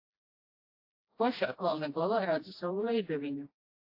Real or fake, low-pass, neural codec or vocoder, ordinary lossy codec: fake; 5.4 kHz; codec, 16 kHz, 1 kbps, FreqCodec, smaller model; AAC, 32 kbps